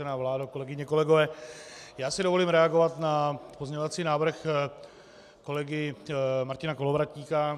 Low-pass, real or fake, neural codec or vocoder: 14.4 kHz; real; none